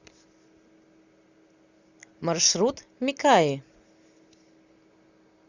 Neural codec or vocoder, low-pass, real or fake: none; 7.2 kHz; real